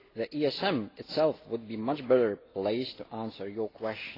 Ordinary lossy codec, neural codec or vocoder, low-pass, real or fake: AAC, 24 kbps; none; 5.4 kHz; real